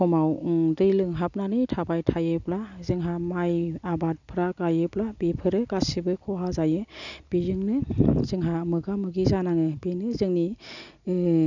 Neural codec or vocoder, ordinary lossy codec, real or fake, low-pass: none; none; real; 7.2 kHz